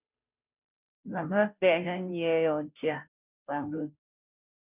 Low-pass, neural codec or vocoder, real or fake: 3.6 kHz; codec, 16 kHz, 0.5 kbps, FunCodec, trained on Chinese and English, 25 frames a second; fake